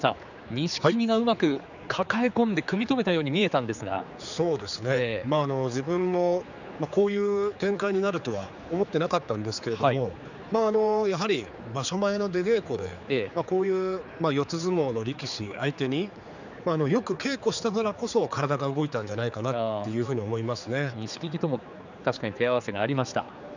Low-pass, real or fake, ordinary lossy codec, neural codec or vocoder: 7.2 kHz; fake; none; codec, 16 kHz, 4 kbps, X-Codec, HuBERT features, trained on general audio